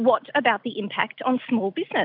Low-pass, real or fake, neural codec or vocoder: 5.4 kHz; real; none